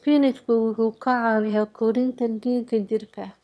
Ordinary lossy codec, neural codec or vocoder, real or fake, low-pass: none; autoencoder, 22.05 kHz, a latent of 192 numbers a frame, VITS, trained on one speaker; fake; none